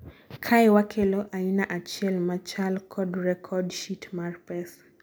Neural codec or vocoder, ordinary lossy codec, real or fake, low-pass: none; none; real; none